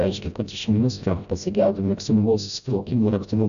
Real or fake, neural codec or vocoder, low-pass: fake; codec, 16 kHz, 0.5 kbps, FreqCodec, smaller model; 7.2 kHz